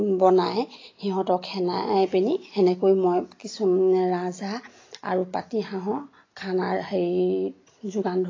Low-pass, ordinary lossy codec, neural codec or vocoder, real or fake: 7.2 kHz; AAC, 32 kbps; none; real